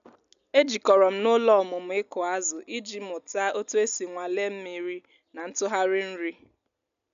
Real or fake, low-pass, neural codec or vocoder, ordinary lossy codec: real; 7.2 kHz; none; none